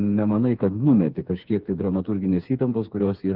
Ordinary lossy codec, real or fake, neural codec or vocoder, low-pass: Opus, 32 kbps; fake; codec, 16 kHz, 4 kbps, FreqCodec, smaller model; 5.4 kHz